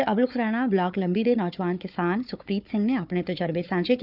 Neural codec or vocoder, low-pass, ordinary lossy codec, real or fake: codec, 16 kHz, 4 kbps, FunCodec, trained on Chinese and English, 50 frames a second; 5.4 kHz; none; fake